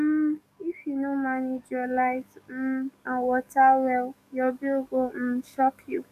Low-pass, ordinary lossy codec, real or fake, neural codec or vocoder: 14.4 kHz; none; fake; codec, 44.1 kHz, 7.8 kbps, DAC